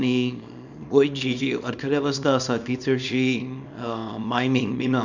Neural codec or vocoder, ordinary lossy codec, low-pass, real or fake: codec, 24 kHz, 0.9 kbps, WavTokenizer, small release; none; 7.2 kHz; fake